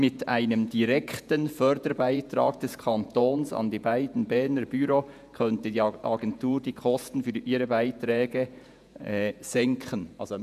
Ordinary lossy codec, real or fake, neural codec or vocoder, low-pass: none; fake; vocoder, 48 kHz, 128 mel bands, Vocos; 14.4 kHz